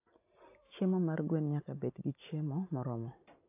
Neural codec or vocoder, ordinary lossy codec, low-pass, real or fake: none; AAC, 32 kbps; 3.6 kHz; real